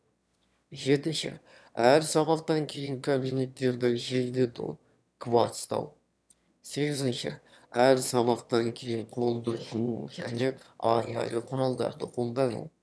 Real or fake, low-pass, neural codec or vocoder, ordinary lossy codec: fake; none; autoencoder, 22.05 kHz, a latent of 192 numbers a frame, VITS, trained on one speaker; none